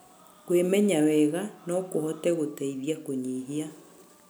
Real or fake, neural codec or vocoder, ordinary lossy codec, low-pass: real; none; none; none